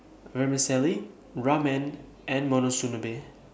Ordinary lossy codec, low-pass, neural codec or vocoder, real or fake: none; none; none; real